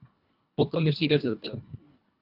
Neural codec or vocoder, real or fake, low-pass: codec, 24 kHz, 1.5 kbps, HILCodec; fake; 5.4 kHz